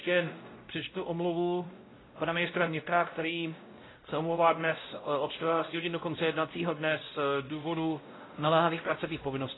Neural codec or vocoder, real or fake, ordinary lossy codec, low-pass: codec, 16 kHz, 0.5 kbps, X-Codec, WavLM features, trained on Multilingual LibriSpeech; fake; AAC, 16 kbps; 7.2 kHz